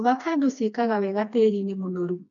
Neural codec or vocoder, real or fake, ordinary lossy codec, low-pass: codec, 16 kHz, 2 kbps, FreqCodec, smaller model; fake; none; 7.2 kHz